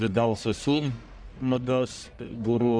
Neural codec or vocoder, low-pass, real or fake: codec, 44.1 kHz, 1.7 kbps, Pupu-Codec; 9.9 kHz; fake